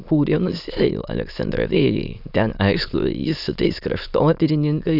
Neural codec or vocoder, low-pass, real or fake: autoencoder, 22.05 kHz, a latent of 192 numbers a frame, VITS, trained on many speakers; 5.4 kHz; fake